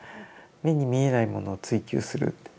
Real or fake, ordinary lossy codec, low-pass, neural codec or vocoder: real; none; none; none